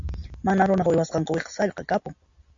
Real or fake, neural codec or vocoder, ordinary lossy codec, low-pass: real; none; AAC, 64 kbps; 7.2 kHz